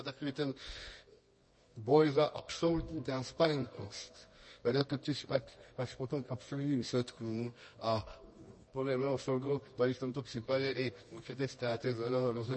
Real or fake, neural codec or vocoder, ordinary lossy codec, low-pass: fake; codec, 24 kHz, 0.9 kbps, WavTokenizer, medium music audio release; MP3, 32 kbps; 10.8 kHz